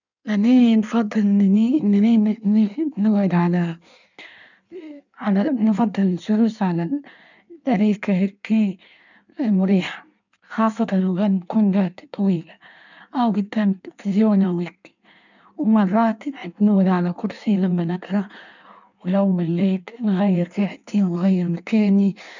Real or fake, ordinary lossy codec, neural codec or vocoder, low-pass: fake; none; codec, 16 kHz in and 24 kHz out, 1.1 kbps, FireRedTTS-2 codec; 7.2 kHz